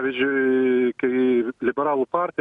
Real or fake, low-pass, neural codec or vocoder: real; 10.8 kHz; none